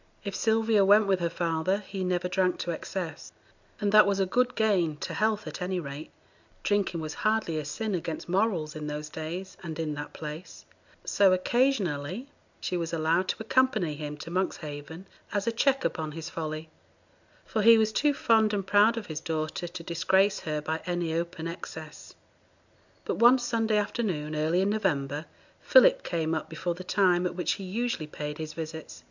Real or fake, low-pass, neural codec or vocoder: real; 7.2 kHz; none